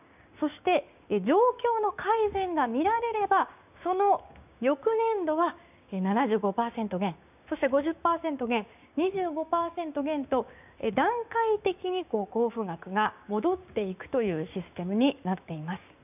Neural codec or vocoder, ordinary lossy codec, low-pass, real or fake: codec, 16 kHz, 6 kbps, DAC; none; 3.6 kHz; fake